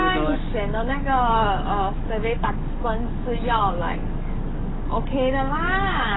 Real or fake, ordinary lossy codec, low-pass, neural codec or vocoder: fake; AAC, 16 kbps; 7.2 kHz; codec, 44.1 kHz, 7.8 kbps, DAC